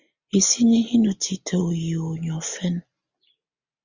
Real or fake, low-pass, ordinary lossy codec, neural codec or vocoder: real; 7.2 kHz; Opus, 64 kbps; none